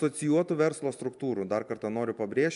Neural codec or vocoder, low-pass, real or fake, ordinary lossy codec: none; 10.8 kHz; real; MP3, 96 kbps